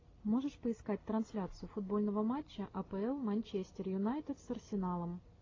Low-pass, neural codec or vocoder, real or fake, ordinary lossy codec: 7.2 kHz; none; real; AAC, 32 kbps